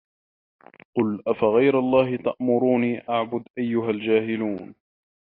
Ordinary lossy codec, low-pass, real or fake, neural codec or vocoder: AAC, 24 kbps; 5.4 kHz; real; none